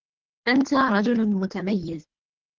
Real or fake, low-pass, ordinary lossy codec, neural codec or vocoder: fake; 7.2 kHz; Opus, 16 kbps; codec, 24 kHz, 3 kbps, HILCodec